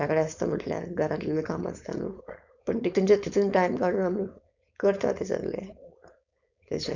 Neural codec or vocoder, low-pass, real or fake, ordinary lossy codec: codec, 16 kHz, 4.8 kbps, FACodec; 7.2 kHz; fake; none